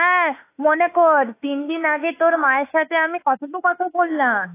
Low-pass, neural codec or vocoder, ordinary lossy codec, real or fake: 3.6 kHz; autoencoder, 48 kHz, 32 numbers a frame, DAC-VAE, trained on Japanese speech; AAC, 24 kbps; fake